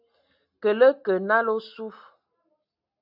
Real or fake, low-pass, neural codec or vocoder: real; 5.4 kHz; none